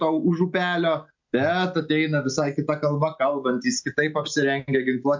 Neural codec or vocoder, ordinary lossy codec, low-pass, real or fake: none; MP3, 48 kbps; 7.2 kHz; real